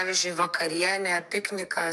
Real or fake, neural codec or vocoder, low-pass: fake; codec, 44.1 kHz, 2.6 kbps, SNAC; 14.4 kHz